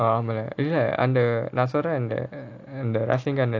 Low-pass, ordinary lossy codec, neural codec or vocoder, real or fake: 7.2 kHz; AAC, 48 kbps; none; real